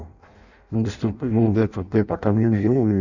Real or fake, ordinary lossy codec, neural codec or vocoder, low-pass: fake; none; codec, 16 kHz in and 24 kHz out, 0.6 kbps, FireRedTTS-2 codec; 7.2 kHz